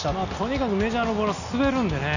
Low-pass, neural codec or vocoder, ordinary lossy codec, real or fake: 7.2 kHz; none; none; real